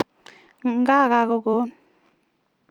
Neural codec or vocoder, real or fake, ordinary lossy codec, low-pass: vocoder, 44.1 kHz, 128 mel bands every 256 samples, BigVGAN v2; fake; none; 19.8 kHz